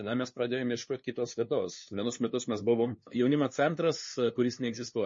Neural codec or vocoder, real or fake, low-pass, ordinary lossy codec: codec, 16 kHz, 2 kbps, FunCodec, trained on LibriTTS, 25 frames a second; fake; 7.2 kHz; MP3, 32 kbps